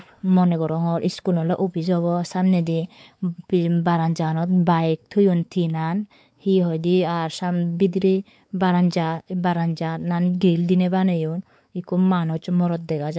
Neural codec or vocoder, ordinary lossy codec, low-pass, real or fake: codec, 16 kHz, 4 kbps, X-Codec, WavLM features, trained on Multilingual LibriSpeech; none; none; fake